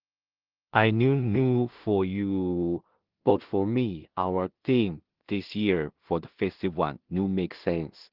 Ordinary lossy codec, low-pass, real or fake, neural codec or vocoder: Opus, 16 kbps; 5.4 kHz; fake; codec, 16 kHz in and 24 kHz out, 0.4 kbps, LongCat-Audio-Codec, two codebook decoder